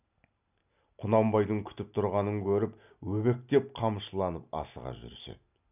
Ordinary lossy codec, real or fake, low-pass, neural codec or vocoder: none; real; 3.6 kHz; none